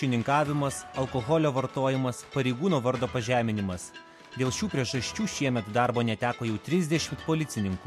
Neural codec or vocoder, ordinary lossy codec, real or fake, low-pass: none; MP3, 64 kbps; real; 14.4 kHz